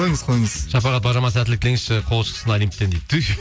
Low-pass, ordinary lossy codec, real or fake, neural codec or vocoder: none; none; real; none